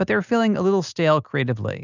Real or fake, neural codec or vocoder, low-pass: real; none; 7.2 kHz